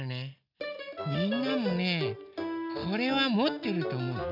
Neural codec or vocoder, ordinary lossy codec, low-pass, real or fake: none; none; 5.4 kHz; real